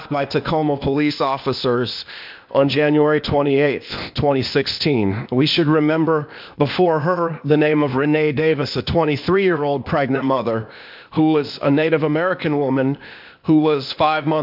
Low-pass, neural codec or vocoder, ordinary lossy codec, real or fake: 5.4 kHz; codec, 16 kHz, 0.8 kbps, ZipCodec; MP3, 48 kbps; fake